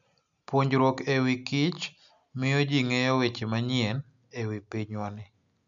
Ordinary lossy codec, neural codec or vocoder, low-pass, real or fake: none; none; 7.2 kHz; real